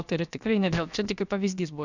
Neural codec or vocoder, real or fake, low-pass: codec, 16 kHz, about 1 kbps, DyCAST, with the encoder's durations; fake; 7.2 kHz